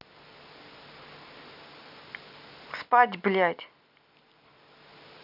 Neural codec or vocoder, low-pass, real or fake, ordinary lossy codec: none; 5.4 kHz; real; none